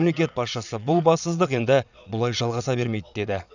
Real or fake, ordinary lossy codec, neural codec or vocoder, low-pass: fake; none; vocoder, 22.05 kHz, 80 mel bands, Vocos; 7.2 kHz